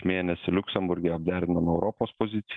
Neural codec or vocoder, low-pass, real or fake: none; 9.9 kHz; real